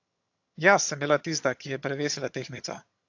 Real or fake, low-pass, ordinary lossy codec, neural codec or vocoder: fake; 7.2 kHz; AAC, 48 kbps; vocoder, 22.05 kHz, 80 mel bands, HiFi-GAN